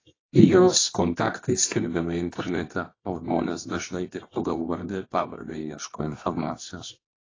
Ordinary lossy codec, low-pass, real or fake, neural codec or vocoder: AAC, 32 kbps; 7.2 kHz; fake; codec, 24 kHz, 0.9 kbps, WavTokenizer, medium music audio release